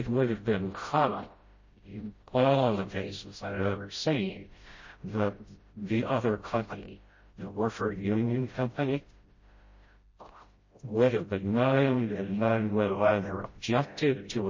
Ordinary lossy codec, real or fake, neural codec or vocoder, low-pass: MP3, 32 kbps; fake; codec, 16 kHz, 0.5 kbps, FreqCodec, smaller model; 7.2 kHz